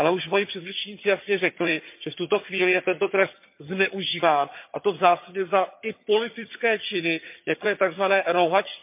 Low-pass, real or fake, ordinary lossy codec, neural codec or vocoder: 3.6 kHz; fake; MP3, 32 kbps; vocoder, 22.05 kHz, 80 mel bands, HiFi-GAN